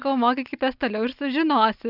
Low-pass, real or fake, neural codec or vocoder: 5.4 kHz; real; none